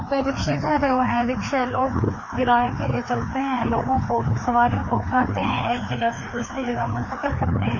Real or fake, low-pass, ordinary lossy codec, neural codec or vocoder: fake; 7.2 kHz; MP3, 32 kbps; codec, 16 kHz, 2 kbps, FreqCodec, larger model